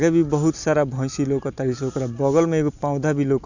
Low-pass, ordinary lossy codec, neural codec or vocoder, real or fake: 7.2 kHz; none; none; real